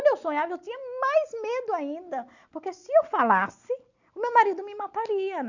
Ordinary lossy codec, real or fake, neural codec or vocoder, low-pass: none; real; none; 7.2 kHz